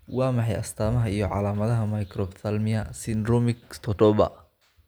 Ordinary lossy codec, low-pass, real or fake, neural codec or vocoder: none; none; real; none